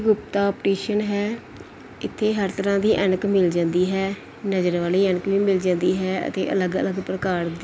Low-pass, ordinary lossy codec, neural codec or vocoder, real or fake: none; none; none; real